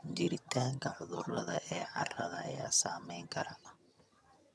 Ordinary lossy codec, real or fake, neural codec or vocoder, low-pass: none; fake; vocoder, 22.05 kHz, 80 mel bands, HiFi-GAN; none